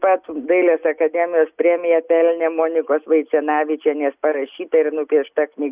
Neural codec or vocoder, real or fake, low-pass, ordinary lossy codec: none; real; 3.6 kHz; Opus, 64 kbps